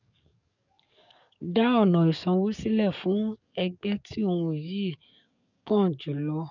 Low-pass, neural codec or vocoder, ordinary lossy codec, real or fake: 7.2 kHz; codec, 44.1 kHz, 7.8 kbps, DAC; none; fake